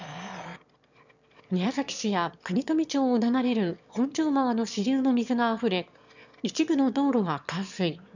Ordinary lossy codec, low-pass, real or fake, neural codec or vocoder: none; 7.2 kHz; fake; autoencoder, 22.05 kHz, a latent of 192 numbers a frame, VITS, trained on one speaker